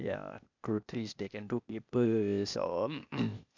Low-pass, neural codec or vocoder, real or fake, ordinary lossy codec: 7.2 kHz; codec, 16 kHz, 0.8 kbps, ZipCodec; fake; none